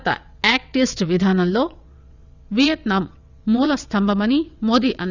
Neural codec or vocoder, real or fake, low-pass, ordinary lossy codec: vocoder, 22.05 kHz, 80 mel bands, WaveNeXt; fake; 7.2 kHz; none